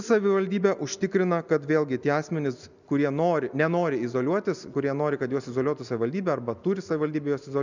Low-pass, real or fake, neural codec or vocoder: 7.2 kHz; real; none